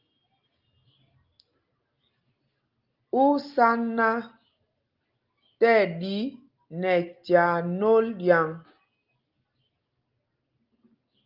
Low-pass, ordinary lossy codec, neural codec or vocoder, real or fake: 5.4 kHz; Opus, 32 kbps; none; real